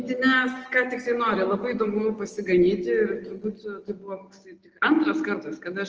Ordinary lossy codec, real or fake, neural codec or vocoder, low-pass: Opus, 24 kbps; real; none; 7.2 kHz